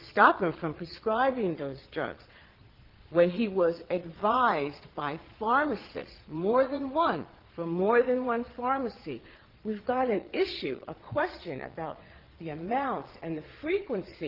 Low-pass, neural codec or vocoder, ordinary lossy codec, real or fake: 5.4 kHz; vocoder, 22.05 kHz, 80 mel bands, Vocos; Opus, 16 kbps; fake